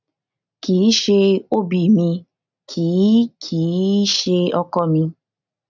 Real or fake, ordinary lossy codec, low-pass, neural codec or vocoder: real; none; 7.2 kHz; none